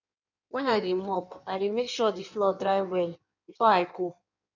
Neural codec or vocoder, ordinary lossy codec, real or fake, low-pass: codec, 16 kHz in and 24 kHz out, 1.1 kbps, FireRedTTS-2 codec; none; fake; 7.2 kHz